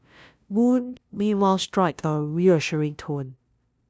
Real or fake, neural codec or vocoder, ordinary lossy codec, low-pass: fake; codec, 16 kHz, 0.5 kbps, FunCodec, trained on LibriTTS, 25 frames a second; none; none